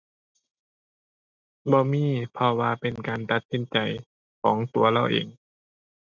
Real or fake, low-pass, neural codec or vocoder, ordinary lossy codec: real; 7.2 kHz; none; none